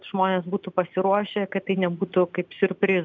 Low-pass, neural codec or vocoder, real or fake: 7.2 kHz; none; real